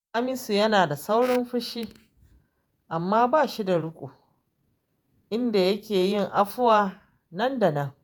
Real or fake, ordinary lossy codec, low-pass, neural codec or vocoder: fake; none; none; vocoder, 48 kHz, 128 mel bands, Vocos